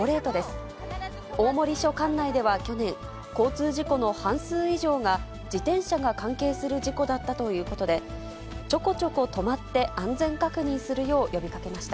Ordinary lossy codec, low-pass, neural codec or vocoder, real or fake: none; none; none; real